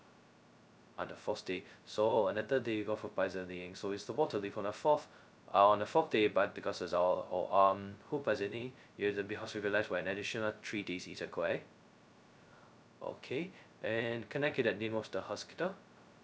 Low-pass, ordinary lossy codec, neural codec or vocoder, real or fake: none; none; codec, 16 kHz, 0.2 kbps, FocalCodec; fake